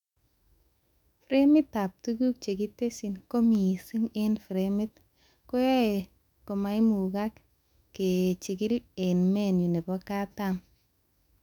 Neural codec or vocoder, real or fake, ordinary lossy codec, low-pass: autoencoder, 48 kHz, 128 numbers a frame, DAC-VAE, trained on Japanese speech; fake; none; 19.8 kHz